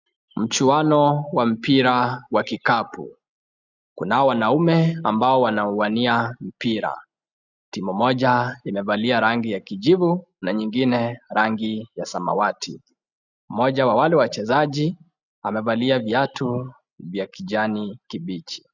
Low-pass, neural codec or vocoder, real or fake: 7.2 kHz; none; real